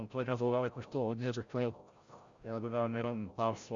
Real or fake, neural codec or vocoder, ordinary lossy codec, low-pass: fake; codec, 16 kHz, 0.5 kbps, FreqCodec, larger model; Opus, 64 kbps; 7.2 kHz